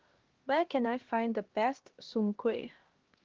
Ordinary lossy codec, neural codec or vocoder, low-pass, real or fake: Opus, 16 kbps; codec, 16 kHz, 1 kbps, X-Codec, HuBERT features, trained on LibriSpeech; 7.2 kHz; fake